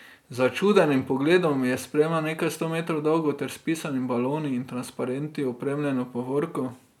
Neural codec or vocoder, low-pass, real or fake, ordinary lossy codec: none; 19.8 kHz; real; none